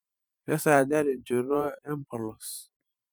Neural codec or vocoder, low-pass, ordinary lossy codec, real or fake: vocoder, 44.1 kHz, 128 mel bands every 512 samples, BigVGAN v2; none; none; fake